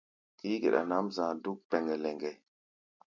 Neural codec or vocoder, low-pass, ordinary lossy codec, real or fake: none; 7.2 kHz; MP3, 64 kbps; real